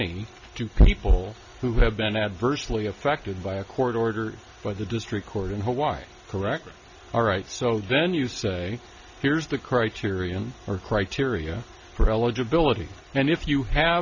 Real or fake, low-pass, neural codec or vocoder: real; 7.2 kHz; none